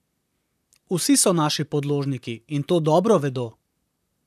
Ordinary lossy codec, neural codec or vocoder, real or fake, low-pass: none; vocoder, 44.1 kHz, 128 mel bands, Pupu-Vocoder; fake; 14.4 kHz